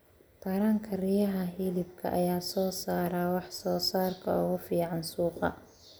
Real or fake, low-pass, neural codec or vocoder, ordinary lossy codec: real; none; none; none